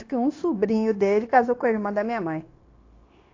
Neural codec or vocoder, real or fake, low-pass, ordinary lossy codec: codec, 16 kHz, 0.9 kbps, LongCat-Audio-Codec; fake; 7.2 kHz; AAC, 32 kbps